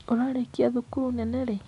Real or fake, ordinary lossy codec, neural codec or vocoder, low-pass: real; none; none; 10.8 kHz